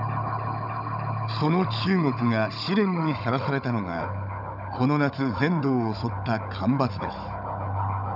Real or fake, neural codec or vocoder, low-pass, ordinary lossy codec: fake; codec, 16 kHz, 16 kbps, FunCodec, trained on Chinese and English, 50 frames a second; 5.4 kHz; none